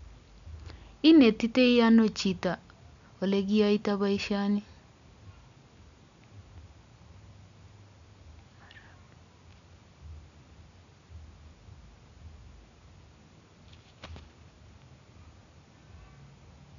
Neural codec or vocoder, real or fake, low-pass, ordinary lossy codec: none; real; 7.2 kHz; none